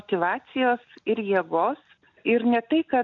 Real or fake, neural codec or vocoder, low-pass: real; none; 7.2 kHz